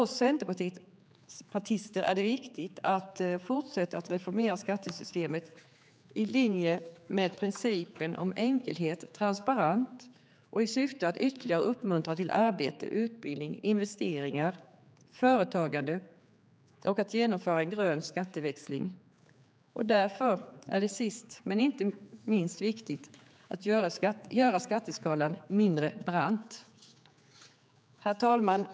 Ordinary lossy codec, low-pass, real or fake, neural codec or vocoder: none; none; fake; codec, 16 kHz, 4 kbps, X-Codec, HuBERT features, trained on general audio